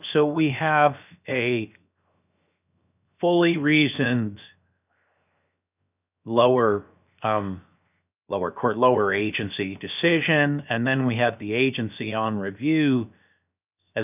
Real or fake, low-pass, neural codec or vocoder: fake; 3.6 kHz; codec, 16 kHz, 0.7 kbps, FocalCodec